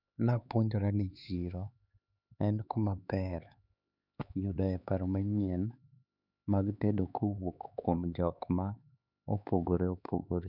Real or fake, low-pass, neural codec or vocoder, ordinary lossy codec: fake; 5.4 kHz; codec, 16 kHz, 4 kbps, X-Codec, HuBERT features, trained on LibriSpeech; none